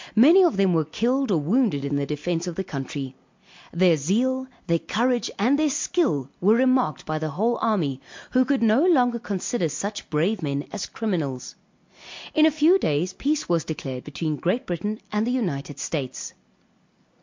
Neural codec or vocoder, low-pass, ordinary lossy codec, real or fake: none; 7.2 kHz; MP3, 48 kbps; real